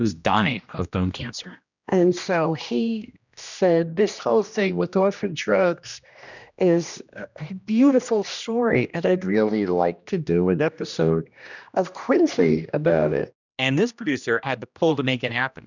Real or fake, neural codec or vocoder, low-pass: fake; codec, 16 kHz, 1 kbps, X-Codec, HuBERT features, trained on general audio; 7.2 kHz